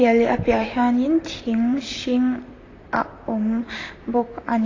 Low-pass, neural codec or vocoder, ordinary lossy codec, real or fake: 7.2 kHz; vocoder, 44.1 kHz, 128 mel bands, Pupu-Vocoder; AAC, 32 kbps; fake